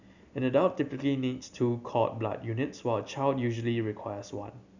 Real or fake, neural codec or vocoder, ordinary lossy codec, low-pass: real; none; none; 7.2 kHz